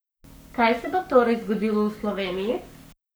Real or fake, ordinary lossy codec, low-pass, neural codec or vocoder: fake; none; none; codec, 44.1 kHz, 7.8 kbps, Pupu-Codec